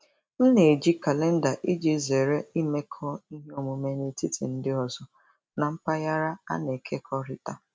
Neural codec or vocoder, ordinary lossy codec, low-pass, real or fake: none; none; none; real